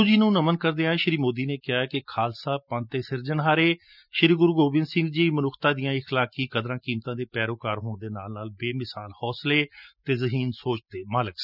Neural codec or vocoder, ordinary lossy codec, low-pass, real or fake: none; none; 5.4 kHz; real